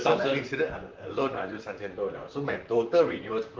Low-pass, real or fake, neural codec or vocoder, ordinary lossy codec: 7.2 kHz; fake; vocoder, 44.1 kHz, 128 mel bands, Pupu-Vocoder; Opus, 24 kbps